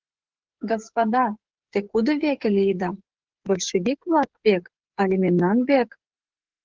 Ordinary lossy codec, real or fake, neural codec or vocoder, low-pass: Opus, 16 kbps; fake; codec, 16 kHz, 8 kbps, FreqCodec, smaller model; 7.2 kHz